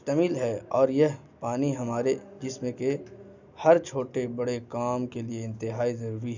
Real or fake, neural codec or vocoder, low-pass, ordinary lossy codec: real; none; 7.2 kHz; none